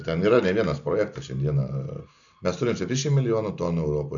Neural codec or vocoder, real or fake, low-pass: none; real; 7.2 kHz